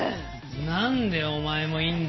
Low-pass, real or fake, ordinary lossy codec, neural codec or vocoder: 7.2 kHz; real; MP3, 24 kbps; none